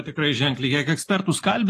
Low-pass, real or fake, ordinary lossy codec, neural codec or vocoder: 14.4 kHz; real; AAC, 48 kbps; none